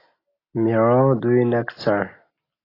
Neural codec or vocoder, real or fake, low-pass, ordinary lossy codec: none; real; 5.4 kHz; AAC, 24 kbps